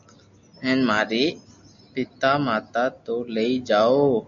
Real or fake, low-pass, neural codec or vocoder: real; 7.2 kHz; none